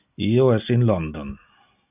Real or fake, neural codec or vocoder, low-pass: real; none; 3.6 kHz